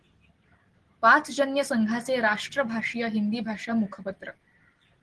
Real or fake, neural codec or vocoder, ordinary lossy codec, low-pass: real; none; Opus, 16 kbps; 10.8 kHz